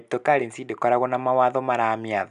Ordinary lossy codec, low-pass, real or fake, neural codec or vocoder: none; 10.8 kHz; real; none